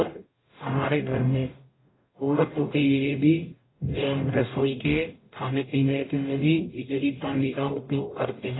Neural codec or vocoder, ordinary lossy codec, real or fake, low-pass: codec, 44.1 kHz, 0.9 kbps, DAC; AAC, 16 kbps; fake; 7.2 kHz